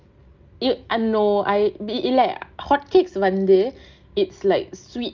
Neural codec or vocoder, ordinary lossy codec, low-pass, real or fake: none; Opus, 24 kbps; 7.2 kHz; real